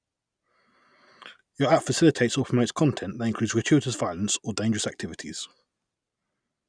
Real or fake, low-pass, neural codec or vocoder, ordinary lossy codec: real; 9.9 kHz; none; none